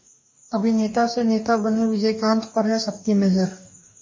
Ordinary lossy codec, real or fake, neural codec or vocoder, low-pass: MP3, 32 kbps; fake; codec, 44.1 kHz, 2.6 kbps, DAC; 7.2 kHz